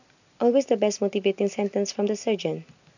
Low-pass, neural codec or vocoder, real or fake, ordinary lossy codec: 7.2 kHz; none; real; none